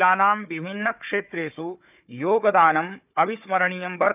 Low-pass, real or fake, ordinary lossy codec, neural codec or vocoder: 3.6 kHz; fake; none; codec, 16 kHz, 4 kbps, FunCodec, trained on Chinese and English, 50 frames a second